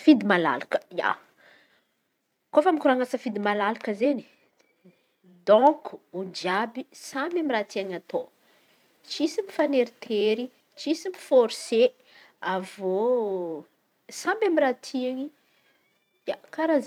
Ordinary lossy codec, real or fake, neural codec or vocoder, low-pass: none; fake; vocoder, 44.1 kHz, 128 mel bands every 512 samples, BigVGAN v2; 19.8 kHz